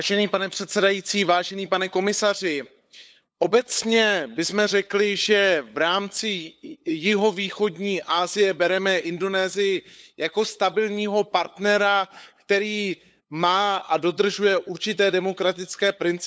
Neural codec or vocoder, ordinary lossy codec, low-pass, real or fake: codec, 16 kHz, 8 kbps, FunCodec, trained on LibriTTS, 25 frames a second; none; none; fake